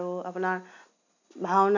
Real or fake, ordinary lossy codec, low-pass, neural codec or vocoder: real; none; 7.2 kHz; none